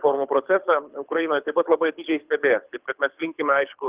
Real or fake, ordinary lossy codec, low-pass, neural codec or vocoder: fake; Opus, 24 kbps; 3.6 kHz; codec, 24 kHz, 6 kbps, HILCodec